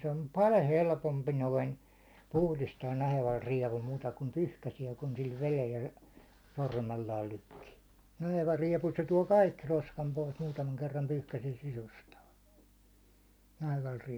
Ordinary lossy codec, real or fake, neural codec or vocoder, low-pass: none; real; none; none